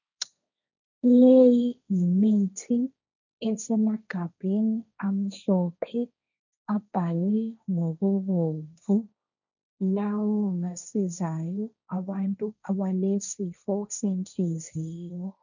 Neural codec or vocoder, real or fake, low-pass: codec, 16 kHz, 1.1 kbps, Voila-Tokenizer; fake; 7.2 kHz